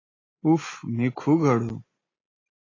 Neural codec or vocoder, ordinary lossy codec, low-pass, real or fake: none; AAC, 32 kbps; 7.2 kHz; real